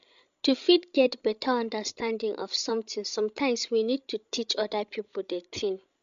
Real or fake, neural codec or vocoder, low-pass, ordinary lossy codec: fake; codec, 16 kHz, 16 kbps, FreqCodec, larger model; 7.2 kHz; MP3, 64 kbps